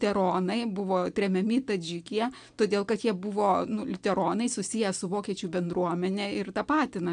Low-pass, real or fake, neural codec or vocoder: 9.9 kHz; real; none